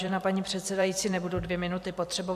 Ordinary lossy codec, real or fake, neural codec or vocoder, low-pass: MP3, 96 kbps; fake; vocoder, 44.1 kHz, 128 mel bands every 512 samples, BigVGAN v2; 14.4 kHz